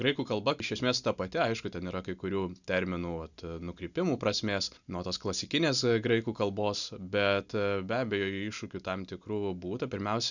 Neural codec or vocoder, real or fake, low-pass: none; real; 7.2 kHz